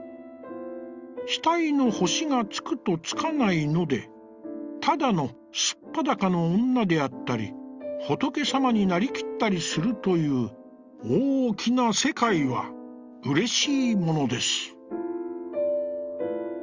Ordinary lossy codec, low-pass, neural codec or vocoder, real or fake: Opus, 32 kbps; 7.2 kHz; none; real